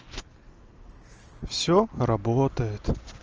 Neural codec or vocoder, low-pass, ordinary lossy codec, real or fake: none; 7.2 kHz; Opus, 24 kbps; real